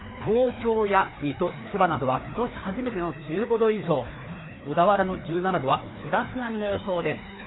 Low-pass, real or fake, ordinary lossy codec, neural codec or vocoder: 7.2 kHz; fake; AAC, 16 kbps; codec, 16 kHz, 2 kbps, FreqCodec, larger model